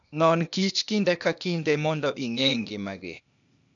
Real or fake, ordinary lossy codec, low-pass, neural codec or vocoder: fake; none; 7.2 kHz; codec, 16 kHz, 0.8 kbps, ZipCodec